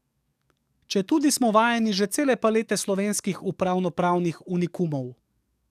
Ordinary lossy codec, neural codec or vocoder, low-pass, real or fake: none; codec, 44.1 kHz, 7.8 kbps, DAC; 14.4 kHz; fake